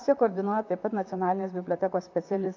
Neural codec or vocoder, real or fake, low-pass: vocoder, 44.1 kHz, 128 mel bands, Pupu-Vocoder; fake; 7.2 kHz